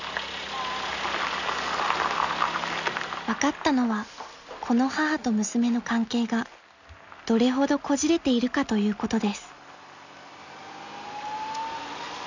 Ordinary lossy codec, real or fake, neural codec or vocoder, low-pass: none; real; none; 7.2 kHz